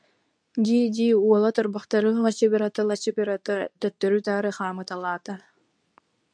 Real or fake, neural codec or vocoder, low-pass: fake; codec, 24 kHz, 0.9 kbps, WavTokenizer, medium speech release version 2; 9.9 kHz